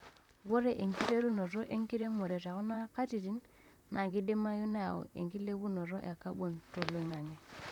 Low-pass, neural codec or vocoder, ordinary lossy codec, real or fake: 19.8 kHz; vocoder, 44.1 kHz, 128 mel bands, Pupu-Vocoder; none; fake